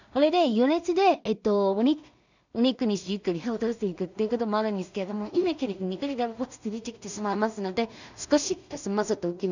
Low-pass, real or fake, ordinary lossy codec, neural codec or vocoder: 7.2 kHz; fake; none; codec, 16 kHz in and 24 kHz out, 0.4 kbps, LongCat-Audio-Codec, two codebook decoder